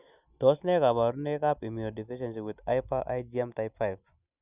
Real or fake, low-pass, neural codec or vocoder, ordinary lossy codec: real; 3.6 kHz; none; none